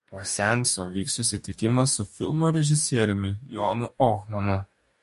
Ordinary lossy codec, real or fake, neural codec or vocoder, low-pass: MP3, 48 kbps; fake; codec, 44.1 kHz, 2.6 kbps, DAC; 14.4 kHz